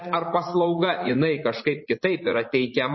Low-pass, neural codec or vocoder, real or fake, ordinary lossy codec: 7.2 kHz; vocoder, 44.1 kHz, 80 mel bands, Vocos; fake; MP3, 24 kbps